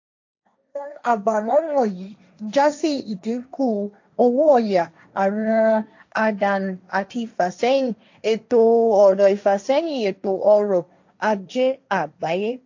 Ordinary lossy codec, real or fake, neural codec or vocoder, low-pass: AAC, 48 kbps; fake; codec, 16 kHz, 1.1 kbps, Voila-Tokenizer; 7.2 kHz